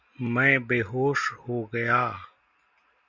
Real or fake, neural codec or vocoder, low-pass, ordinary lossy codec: real; none; 7.2 kHz; Opus, 64 kbps